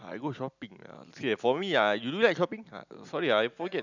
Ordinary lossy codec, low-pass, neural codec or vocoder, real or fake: none; 7.2 kHz; none; real